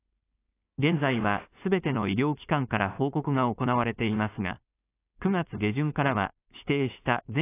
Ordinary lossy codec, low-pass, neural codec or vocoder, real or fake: AAC, 24 kbps; 3.6 kHz; codec, 16 kHz, 4.8 kbps, FACodec; fake